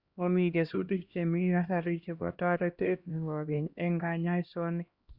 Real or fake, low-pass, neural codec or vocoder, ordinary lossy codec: fake; 5.4 kHz; codec, 16 kHz, 1 kbps, X-Codec, HuBERT features, trained on LibriSpeech; Opus, 64 kbps